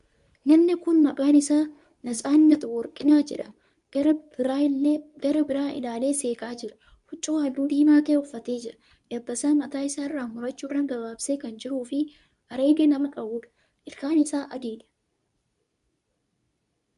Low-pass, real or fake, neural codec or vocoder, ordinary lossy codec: 10.8 kHz; fake; codec, 24 kHz, 0.9 kbps, WavTokenizer, medium speech release version 2; MP3, 96 kbps